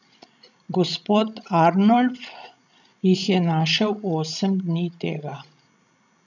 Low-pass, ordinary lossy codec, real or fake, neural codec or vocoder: 7.2 kHz; none; fake; codec, 16 kHz, 16 kbps, FreqCodec, larger model